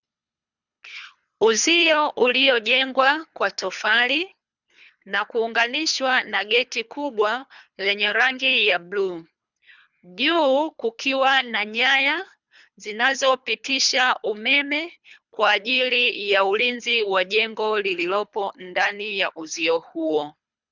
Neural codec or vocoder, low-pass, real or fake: codec, 24 kHz, 3 kbps, HILCodec; 7.2 kHz; fake